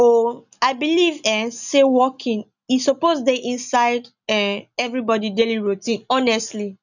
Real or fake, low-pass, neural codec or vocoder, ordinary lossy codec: real; 7.2 kHz; none; none